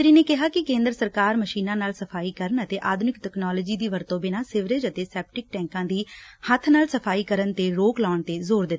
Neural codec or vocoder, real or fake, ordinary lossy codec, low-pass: none; real; none; none